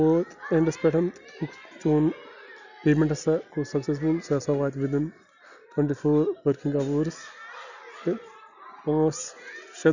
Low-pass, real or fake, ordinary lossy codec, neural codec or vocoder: 7.2 kHz; real; none; none